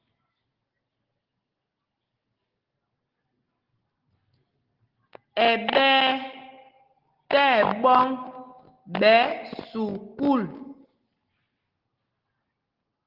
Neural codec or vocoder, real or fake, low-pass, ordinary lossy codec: none; real; 5.4 kHz; Opus, 16 kbps